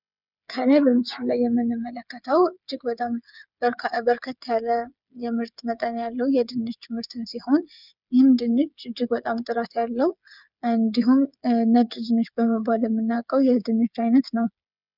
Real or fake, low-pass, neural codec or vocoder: fake; 5.4 kHz; codec, 16 kHz, 8 kbps, FreqCodec, smaller model